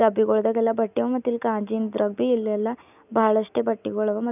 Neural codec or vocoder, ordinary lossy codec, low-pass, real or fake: none; none; 3.6 kHz; real